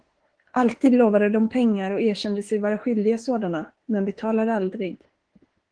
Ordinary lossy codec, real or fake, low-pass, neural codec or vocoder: Opus, 16 kbps; fake; 9.9 kHz; autoencoder, 48 kHz, 32 numbers a frame, DAC-VAE, trained on Japanese speech